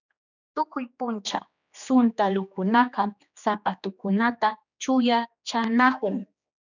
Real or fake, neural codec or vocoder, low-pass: fake; codec, 16 kHz, 2 kbps, X-Codec, HuBERT features, trained on general audio; 7.2 kHz